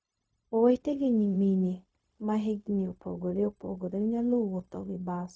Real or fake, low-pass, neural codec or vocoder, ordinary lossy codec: fake; none; codec, 16 kHz, 0.4 kbps, LongCat-Audio-Codec; none